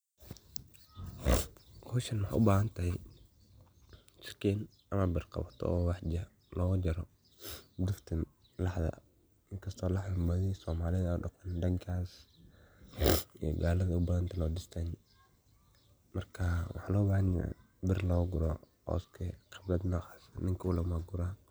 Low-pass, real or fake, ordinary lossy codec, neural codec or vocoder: none; real; none; none